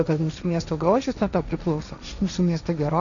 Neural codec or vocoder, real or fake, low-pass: codec, 16 kHz, 1.1 kbps, Voila-Tokenizer; fake; 7.2 kHz